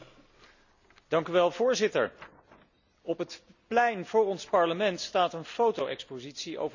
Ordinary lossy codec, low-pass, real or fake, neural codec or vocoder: none; 7.2 kHz; real; none